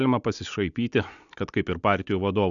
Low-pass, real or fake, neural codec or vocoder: 7.2 kHz; real; none